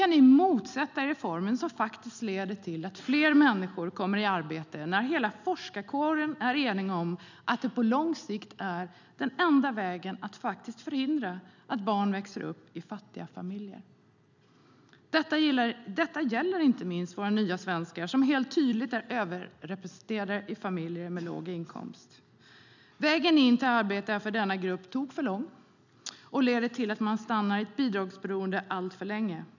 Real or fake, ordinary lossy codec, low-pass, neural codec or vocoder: real; none; 7.2 kHz; none